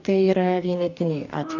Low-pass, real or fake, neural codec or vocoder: 7.2 kHz; fake; codec, 44.1 kHz, 2.6 kbps, DAC